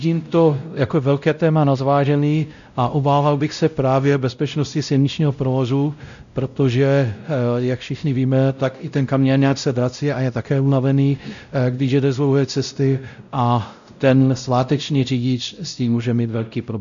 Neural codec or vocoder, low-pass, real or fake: codec, 16 kHz, 0.5 kbps, X-Codec, WavLM features, trained on Multilingual LibriSpeech; 7.2 kHz; fake